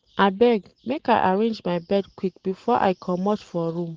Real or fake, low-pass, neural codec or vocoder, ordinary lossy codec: real; 7.2 kHz; none; Opus, 16 kbps